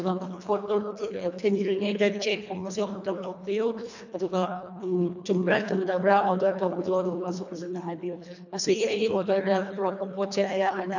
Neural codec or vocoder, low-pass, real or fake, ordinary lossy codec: codec, 24 kHz, 1.5 kbps, HILCodec; 7.2 kHz; fake; none